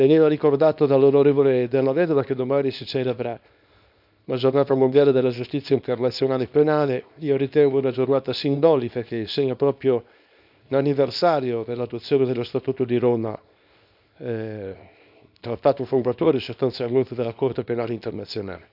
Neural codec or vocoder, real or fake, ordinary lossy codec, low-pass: codec, 24 kHz, 0.9 kbps, WavTokenizer, small release; fake; none; 5.4 kHz